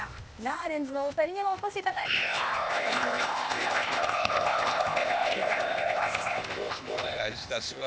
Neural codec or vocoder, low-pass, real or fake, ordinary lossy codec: codec, 16 kHz, 0.8 kbps, ZipCodec; none; fake; none